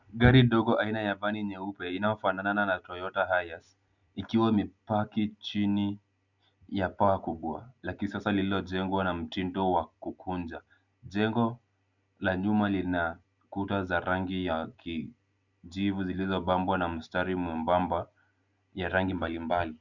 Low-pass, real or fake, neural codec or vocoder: 7.2 kHz; real; none